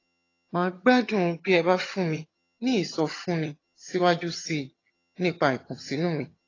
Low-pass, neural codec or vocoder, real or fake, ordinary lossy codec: 7.2 kHz; vocoder, 22.05 kHz, 80 mel bands, HiFi-GAN; fake; AAC, 32 kbps